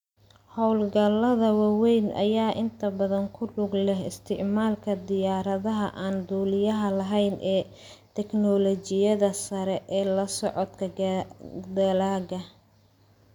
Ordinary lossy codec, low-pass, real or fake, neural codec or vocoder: none; 19.8 kHz; real; none